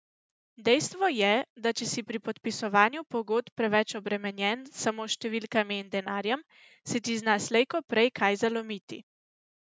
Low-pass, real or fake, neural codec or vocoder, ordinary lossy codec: none; real; none; none